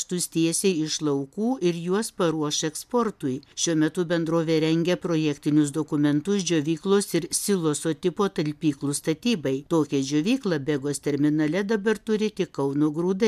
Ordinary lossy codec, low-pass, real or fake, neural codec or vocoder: MP3, 96 kbps; 14.4 kHz; real; none